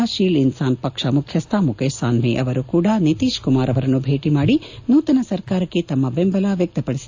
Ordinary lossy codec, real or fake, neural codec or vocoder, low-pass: AAC, 48 kbps; real; none; 7.2 kHz